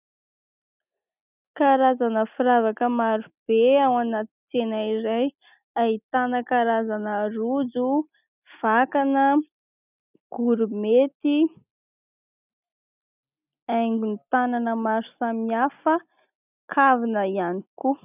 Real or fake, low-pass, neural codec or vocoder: real; 3.6 kHz; none